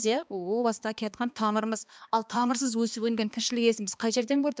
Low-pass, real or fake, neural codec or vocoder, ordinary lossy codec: none; fake; codec, 16 kHz, 2 kbps, X-Codec, HuBERT features, trained on balanced general audio; none